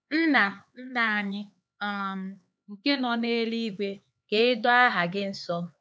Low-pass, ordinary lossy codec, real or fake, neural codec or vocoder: none; none; fake; codec, 16 kHz, 4 kbps, X-Codec, HuBERT features, trained on LibriSpeech